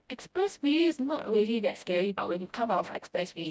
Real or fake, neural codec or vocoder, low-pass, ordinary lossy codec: fake; codec, 16 kHz, 0.5 kbps, FreqCodec, smaller model; none; none